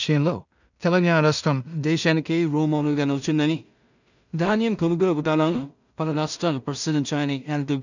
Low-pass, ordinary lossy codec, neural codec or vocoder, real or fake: 7.2 kHz; none; codec, 16 kHz in and 24 kHz out, 0.4 kbps, LongCat-Audio-Codec, two codebook decoder; fake